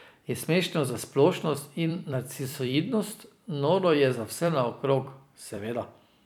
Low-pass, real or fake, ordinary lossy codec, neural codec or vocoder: none; fake; none; vocoder, 44.1 kHz, 128 mel bands every 256 samples, BigVGAN v2